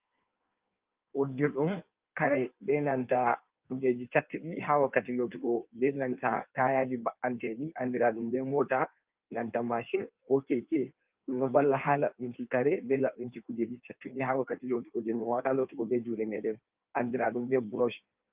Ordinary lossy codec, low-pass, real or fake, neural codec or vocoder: Opus, 24 kbps; 3.6 kHz; fake; codec, 16 kHz in and 24 kHz out, 1.1 kbps, FireRedTTS-2 codec